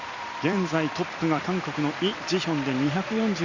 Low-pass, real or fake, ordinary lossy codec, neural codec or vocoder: 7.2 kHz; fake; Opus, 64 kbps; vocoder, 44.1 kHz, 128 mel bands every 256 samples, BigVGAN v2